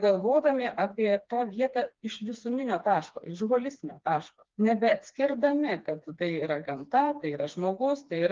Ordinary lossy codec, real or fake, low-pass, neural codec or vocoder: Opus, 32 kbps; fake; 7.2 kHz; codec, 16 kHz, 2 kbps, FreqCodec, smaller model